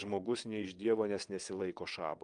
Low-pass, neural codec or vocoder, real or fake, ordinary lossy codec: 9.9 kHz; vocoder, 22.05 kHz, 80 mel bands, WaveNeXt; fake; Opus, 32 kbps